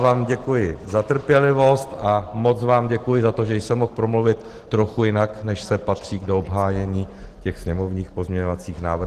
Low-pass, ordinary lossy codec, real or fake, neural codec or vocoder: 14.4 kHz; Opus, 16 kbps; real; none